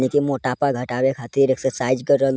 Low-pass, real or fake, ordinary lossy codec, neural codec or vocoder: none; real; none; none